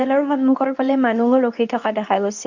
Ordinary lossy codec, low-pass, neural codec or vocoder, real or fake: none; 7.2 kHz; codec, 24 kHz, 0.9 kbps, WavTokenizer, medium speech release version 2; fake